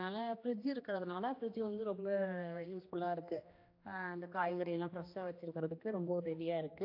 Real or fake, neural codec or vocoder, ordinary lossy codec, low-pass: fake; codec, 16 kHz, 1 kbps, X-Codec, HuBERT features, trained on general audio; AAC, 32 kbps; 5.4 kHz